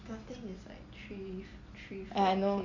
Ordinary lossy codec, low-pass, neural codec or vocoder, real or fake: AAC, 48 kbps; 7.2 kHz; none; real